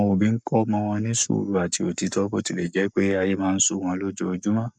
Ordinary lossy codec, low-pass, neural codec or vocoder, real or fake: none; 9.9 kHz; codec, 44.1 kHz, 7.8 kbps, Pupu-Codec; fake